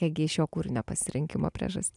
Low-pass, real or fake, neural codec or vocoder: 10.8 kHz; real; none